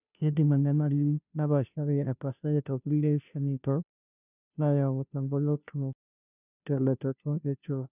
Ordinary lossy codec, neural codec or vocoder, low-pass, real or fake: none; codec, 16 kHz, 0.5 kbps, FunCodec, trained on Chinese and English, 25 frames a second; 3.6 kHz; fake